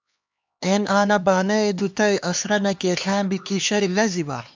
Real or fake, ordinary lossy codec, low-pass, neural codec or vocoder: fake; MP3, 64 kbps; 7.2 kHz; codec, 16 kHz, 2 kbps, X-Codec, HuBERT features, trained on LibriSpeech